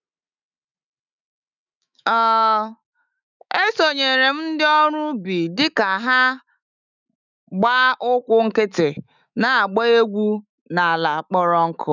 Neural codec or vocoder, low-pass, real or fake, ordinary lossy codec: autoencoder, 48 kHz, 128 numbers a frame, DAC-VAE, trained on Japanese speech; 7.2 kHz; fake; none